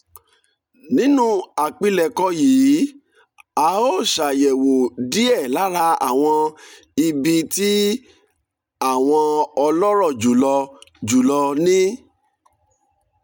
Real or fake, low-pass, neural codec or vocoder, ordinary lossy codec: real; 19.8 kHz; none; none